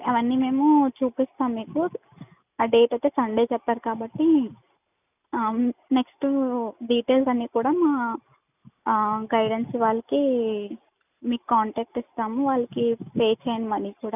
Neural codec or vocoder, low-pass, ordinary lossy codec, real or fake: none; 3.6 kHz; none; real